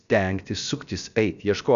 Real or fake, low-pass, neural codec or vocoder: fake; 7.2 kHz; codec, 16 kHz, about 1 kbps, DyCAST, with the encoder's durations